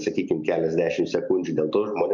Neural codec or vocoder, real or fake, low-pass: none; real; 7.2 kHz